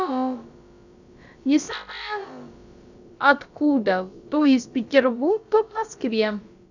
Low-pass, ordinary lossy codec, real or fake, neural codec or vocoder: 7.2 kHz; none; fake; codec, 16 kHz, about 1 kbps, DyCAST, with the encoder's durations